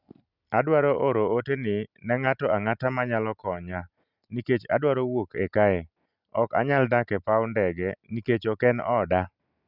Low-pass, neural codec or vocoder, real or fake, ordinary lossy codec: 5.4 kHz; none; real; none